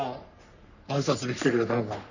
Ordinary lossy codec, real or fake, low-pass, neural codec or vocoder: none; fake; 7.2 kHz; codec, 44.1 kHz, 3.4 kbps, Pupu-Codec